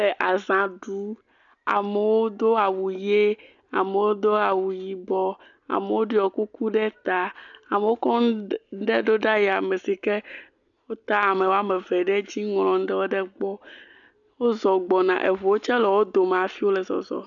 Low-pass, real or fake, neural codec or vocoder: 7.2 kHz; real; none